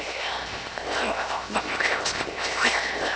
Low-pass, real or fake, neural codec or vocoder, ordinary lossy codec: none; fake; codec, 16 kHz, 0.7 kbps, FocalCodec; none